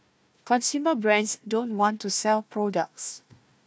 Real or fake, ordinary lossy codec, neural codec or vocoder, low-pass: fake; none; codec, 16 kHz, 1 kbps, FunCodec, trained on Chinese and English, 50 frames a second; none